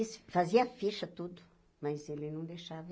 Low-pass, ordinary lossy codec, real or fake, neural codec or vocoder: none; none; real; none